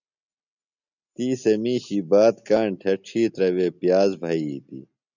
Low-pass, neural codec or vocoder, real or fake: 7.2 kHz; none; real